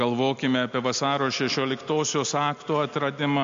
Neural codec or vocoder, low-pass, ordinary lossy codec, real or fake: none; 7.2 kHz; MP3, 48 kbps; real